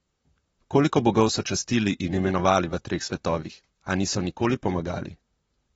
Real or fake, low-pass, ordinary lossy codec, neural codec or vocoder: fake; 19.8 kHz; AAC, 24 kbps; vocoder, 44.1 kHz, 128 mel bands, Pupu-Vocoder